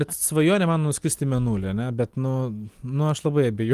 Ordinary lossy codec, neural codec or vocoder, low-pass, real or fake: Opus, 24 kbps; none; 14.4 kHz; real